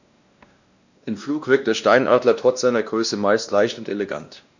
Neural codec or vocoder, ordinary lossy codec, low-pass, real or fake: codec, 16 kHz, 1 kbps, X-Codec, WavLM features, trained on Multilingual LibriSpeech; none; 7.2 kHz; fake